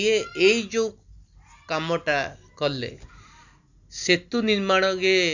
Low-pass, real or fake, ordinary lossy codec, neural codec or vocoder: 7.2 kHz; real; none; none